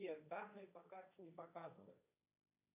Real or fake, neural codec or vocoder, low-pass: fake; codec, 16 kHz, 1.1 kbps, Voila-Tokenizer; 3.6 kHz